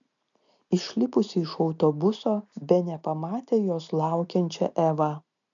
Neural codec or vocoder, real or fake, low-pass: none; real; 7.2 kHz